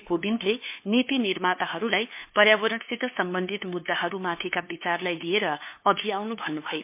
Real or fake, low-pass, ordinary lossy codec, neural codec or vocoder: fake; 3.6 kHz; MP3, 24 kbps; codec, 16 kHz, 2 kbps, FunCodec, trained on LibriTTS, 25 frames a second